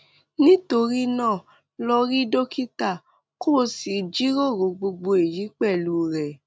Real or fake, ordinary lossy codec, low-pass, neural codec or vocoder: real; none; none; none